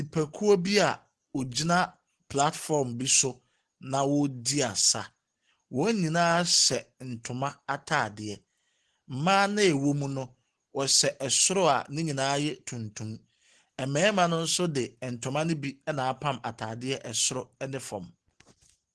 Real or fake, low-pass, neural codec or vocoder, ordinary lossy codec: real; 10.8 kHz; none; Opus, 16 kbps